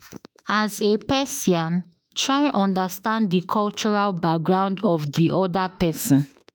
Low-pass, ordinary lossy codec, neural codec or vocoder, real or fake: none; none; autoencoder, 48 kHz, 32 numbers a frame, DAC-VAE, trained on Japanese speech; fake